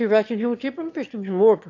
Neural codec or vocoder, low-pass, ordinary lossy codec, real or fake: autoencoder, 22.05 kHz, a latent of 192 numbers a frame, VITS, trained on one speaker; 7.2 kHz; AAC, 48 kbps; fake